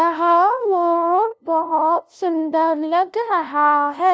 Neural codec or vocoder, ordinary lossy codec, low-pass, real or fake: codec, 16 kHz, 0.5 kbps, FunCodec, trained on LibriTTS, 25 frames a second; none; none; fake